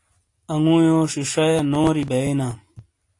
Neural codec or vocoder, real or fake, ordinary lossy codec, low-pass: none; real; AAC, 48 kbps; 10.8 kHz